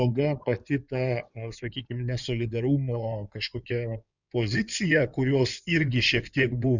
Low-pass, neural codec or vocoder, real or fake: 7.2 kHz; codec, 16 kHz in and 24 kHz out, 2.2 kbps, FireRedTTS-2 codec; fake